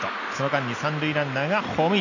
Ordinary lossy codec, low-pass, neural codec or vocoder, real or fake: none; 7.2 kHz; none; real